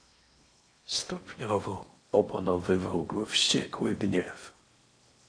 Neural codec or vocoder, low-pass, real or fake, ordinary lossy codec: codec, 16 kHz in and 24 kHz out, 0.8 kbps, FocalCodec, streaming, 65536 codes; 9.9 kHz; fake; AAC, 48 kbps